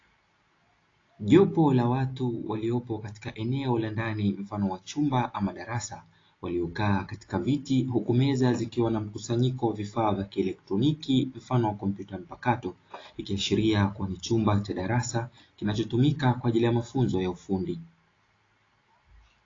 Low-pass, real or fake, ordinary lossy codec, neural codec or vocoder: 7.2 kHz; real; AAC, 32 kbps; none